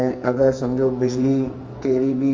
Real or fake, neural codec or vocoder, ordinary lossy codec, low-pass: fake; codec, 44.1 kHz, 2.6 kbps, SNAC; Opus, 32 kbps; 7.2 kHz